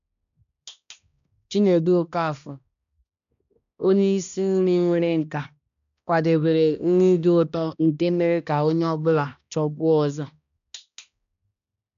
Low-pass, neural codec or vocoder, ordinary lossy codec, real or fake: 7.2 kHz; codec, 16 kHz, 1 kbps, X-Codec, HuBERT features, trained on balanced general audio; none; fake